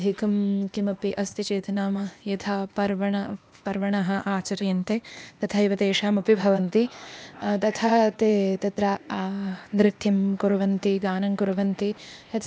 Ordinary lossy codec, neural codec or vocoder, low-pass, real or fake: none; codec, 16 kHz, 0.8 kbps, ZipCodec; none; fake